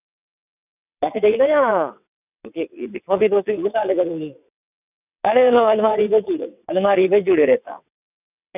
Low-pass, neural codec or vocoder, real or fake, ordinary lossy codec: 3.6 kHz; vocoder, 22.05 kHz, 80 mel bands, WaveNeXt; fake; none